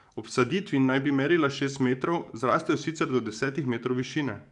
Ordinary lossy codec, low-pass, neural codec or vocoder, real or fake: none; 10.8 kHz; codec, 44.1 kHz, 7.8 kbps, DAC; fake